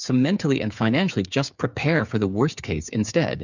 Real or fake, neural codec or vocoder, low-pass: fake; codec, 16 kHz, 8 kbps, FreqCodec, smaller model; 7.2 kHz